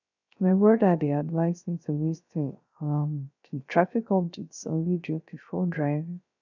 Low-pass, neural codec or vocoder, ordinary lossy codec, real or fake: 7.2 kHz; codec, 16 kHz, 0.3 kbps, FocalCodec; none; fake